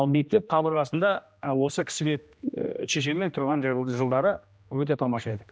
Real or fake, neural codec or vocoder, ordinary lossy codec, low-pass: fake; codec, 16 kHz, 1 kbps, X-Codec, HuBERT features, trained on general audio; none; none